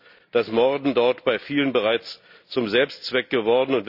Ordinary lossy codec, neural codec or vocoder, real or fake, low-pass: none; none; real; 5.4 kHz